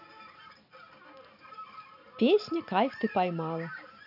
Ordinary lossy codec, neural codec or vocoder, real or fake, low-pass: none; none; real; 5.4 kHz